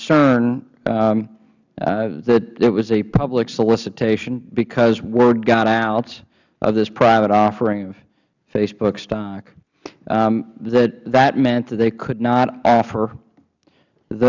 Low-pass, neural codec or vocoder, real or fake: 7.2 kHz; none; real